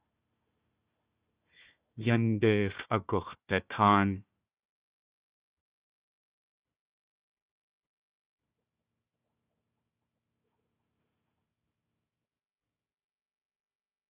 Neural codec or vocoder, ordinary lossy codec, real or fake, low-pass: codec, 16 kHz, 1 kbps, FunCodec, trained on Chinese and English, 50 frames a second; Opus, 64 kbps; fake; 3.6 kHz